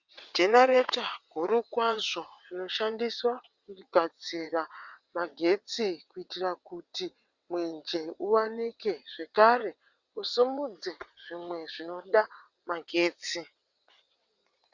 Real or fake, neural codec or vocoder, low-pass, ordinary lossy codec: fake; vocoder, 22.05 kHz, 80 mel bands, WaveNeXt; 7.2 kHz; Opus, 64 kbps